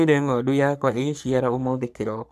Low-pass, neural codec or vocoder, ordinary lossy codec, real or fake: 14.4 kHz; codec, 44.1 kHz, 3.4 kbps, Pupu-Codec; none; fake